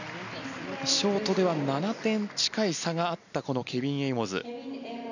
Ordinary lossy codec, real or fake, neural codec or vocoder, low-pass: none; real; none; 7.2 kHz